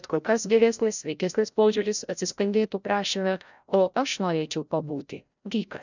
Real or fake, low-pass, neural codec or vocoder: fake; 7.2 kHz; codec, 16 kHz, 0.5 kbps, FreqCodec, larger model